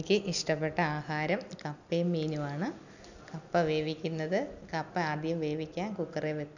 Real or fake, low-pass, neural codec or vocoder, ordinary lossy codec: real; 7.2 kHz; none; none